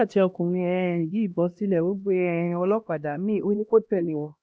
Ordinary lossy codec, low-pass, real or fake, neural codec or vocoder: none; none; fake; codec, 16 kHz, 1 kbps, X-Codec, HuBERT features, trained on LibriSpeech